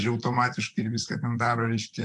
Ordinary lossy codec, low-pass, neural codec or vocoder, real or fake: AAC, 64 kbps; 10.8 kHz; vocoder, 44.1 kHz, 128 mel bands, Pupu-Vocoder; fake